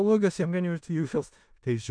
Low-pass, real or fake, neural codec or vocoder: 9.9 kHz; fake; codec, 16 kHz in and 24 kHz out, 0.4 kbps, LongCat-Audio-Codec, four codebook decoder